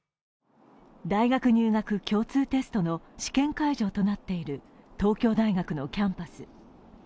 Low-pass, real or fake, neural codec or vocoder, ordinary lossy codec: none; real; none; none